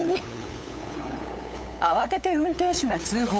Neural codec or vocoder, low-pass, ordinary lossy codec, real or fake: codec, 16 kHz, 8 kbps, FunCodec, trained on LibriTTS, 25 frames a second; none; none; fake